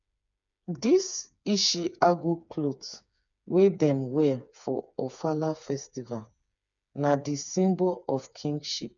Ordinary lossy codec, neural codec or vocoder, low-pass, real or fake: none; codec, 16 kHz, 4 kbps, FreqCodec, smaller model; 7.2 kHz; fake